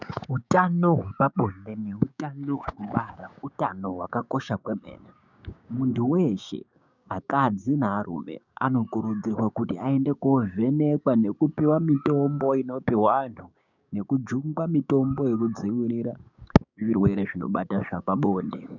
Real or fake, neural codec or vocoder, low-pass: fake; codec, 16 kHz, 6 kbps, DAC; 7.2 kHz